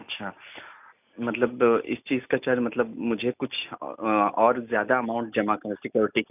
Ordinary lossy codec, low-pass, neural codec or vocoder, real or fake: none; 3.6 kHz; none; real